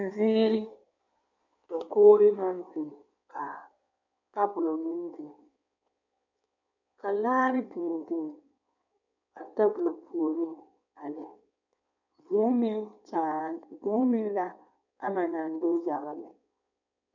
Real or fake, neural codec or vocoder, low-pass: fake; codec, 16 kHz in and 24 kHz out, 1.1 kbps, FireRedTTS-2 codec; 7.2 kHz